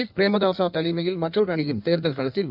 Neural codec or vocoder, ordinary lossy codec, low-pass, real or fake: codec, 16 kHz in and 24 kHz out, 1.1 kbps, FireRedTTS-2 codec; none; 5.4 kHz; fake